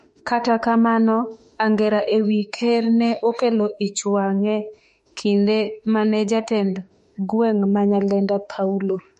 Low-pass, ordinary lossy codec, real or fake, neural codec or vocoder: 14.4 kHz; MP3, 48 kbps; fake; autoencoder, 48 kHz, 32 numbers a frame, DAC-VAE, trained on Japanese speech